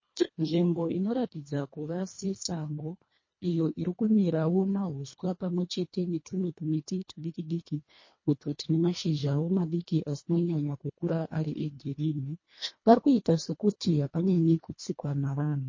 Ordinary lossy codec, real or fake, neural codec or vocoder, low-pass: MP3, 32 kbps; fake; codec, 24 kHz, 1.5 kbps, HILCodec; 7.2 kHz